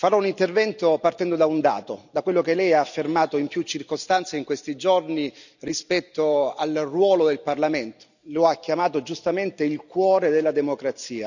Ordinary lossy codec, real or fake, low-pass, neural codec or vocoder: none; real; 7.2 kHz; none